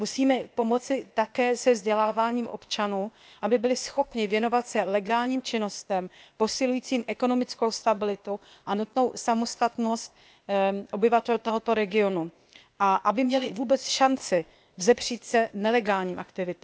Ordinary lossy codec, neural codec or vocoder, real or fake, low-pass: none; codec, 16 kHz, 0.8 kbps, ZipCodec; fake; none